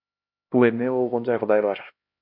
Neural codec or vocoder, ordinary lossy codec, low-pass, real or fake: codec, 16 kHz, 1 kbps, X-Codec, HuBERT features, trained on LibriSpeech; MP3, 48 kbps; 5.4 kHz; fake